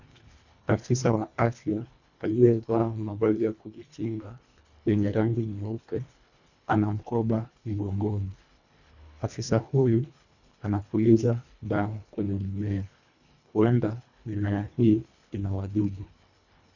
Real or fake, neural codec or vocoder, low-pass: fake; codec, 24 kHz, 1.5 kbps, HILCodec; 7.2 kHz